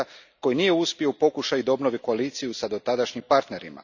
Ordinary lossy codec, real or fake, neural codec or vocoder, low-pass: none; real; none; 7.2 kHz